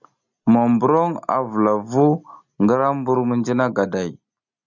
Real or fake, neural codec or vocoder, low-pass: real; none; 7.2 kHz